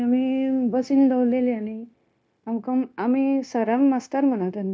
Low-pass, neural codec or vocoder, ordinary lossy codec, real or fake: none; codec, 16 kHz, 0.9 kbps, LongCat-Audio-Codec; none; fake